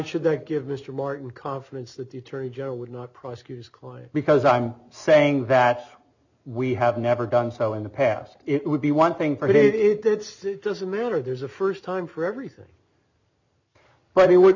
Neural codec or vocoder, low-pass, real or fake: none; 7.2 kHz; real